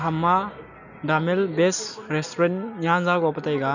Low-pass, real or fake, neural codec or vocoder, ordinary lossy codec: 7.2 kHz; real; none; none